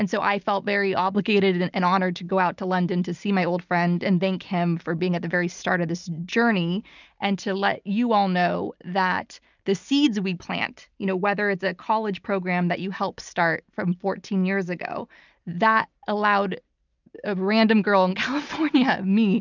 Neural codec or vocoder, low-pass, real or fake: none; 7.2 kHz; real